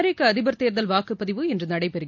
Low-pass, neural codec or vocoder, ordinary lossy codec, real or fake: 7.2 kHz; none; MP3, 48 kbps; real